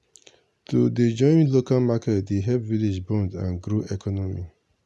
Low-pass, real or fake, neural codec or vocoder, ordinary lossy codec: none; real; none; none